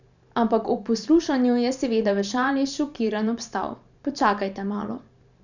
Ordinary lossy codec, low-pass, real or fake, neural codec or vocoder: none; 7.2 kHz; fake; vocoder, 44.1 kHz, 128 mel bands every 512 samples, BigVGAN v2